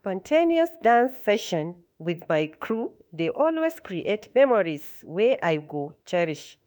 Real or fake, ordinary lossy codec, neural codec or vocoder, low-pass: fake; none; autoencoder, 48 kHz, 32 numbers a frame, DAC-VAE, trained on Japanese speech; none